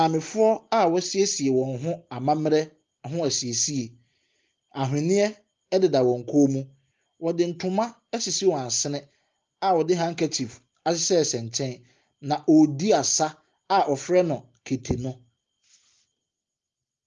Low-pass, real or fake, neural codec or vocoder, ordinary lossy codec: 7.2 kHz; real; none; Opus, 32 kbps